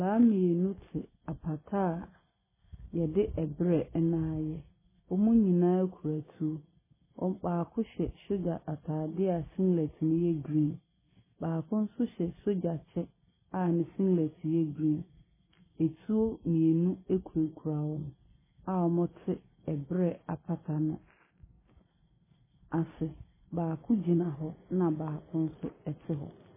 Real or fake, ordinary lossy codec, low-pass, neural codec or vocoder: real; MP3, 16 kbps; 3.6 kHz; none